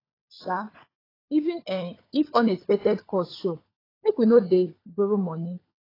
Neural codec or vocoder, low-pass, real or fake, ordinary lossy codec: codec, 16 kHz, 16 kbps, FunCodec, trained on LibriTTS, 50 frames a second; 5.4 kHz; fake; AAC, 24 kbps